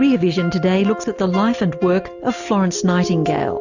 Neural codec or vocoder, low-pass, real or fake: none; 7.2 kHz; real